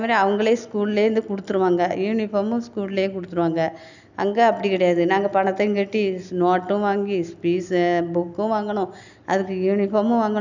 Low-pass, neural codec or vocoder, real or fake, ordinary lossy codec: 7.2 kHz; none; real; none